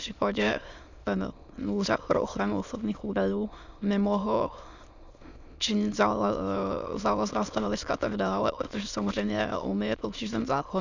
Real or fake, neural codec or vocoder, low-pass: fake; autoencoder, 22.05 kHz, a latent of 192 numbers a frame, VITS, trained on many speakers; 7.2 kHz